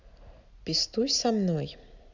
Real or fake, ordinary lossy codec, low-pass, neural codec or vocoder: real; Opus, 64 kbps; 7.2 kHz; none